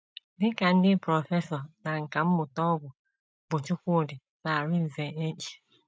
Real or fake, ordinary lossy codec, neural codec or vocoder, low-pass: real; none; none; none